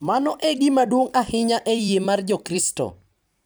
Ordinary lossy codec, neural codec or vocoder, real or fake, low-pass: none; vocoder, 44.1 kHz, 128 mel bands every 256 samples, BigVGAN v2; fake; none